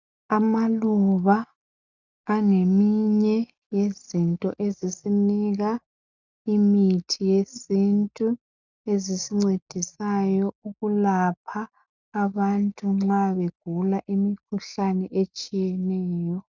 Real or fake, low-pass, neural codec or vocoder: real; 7.2 kHz; none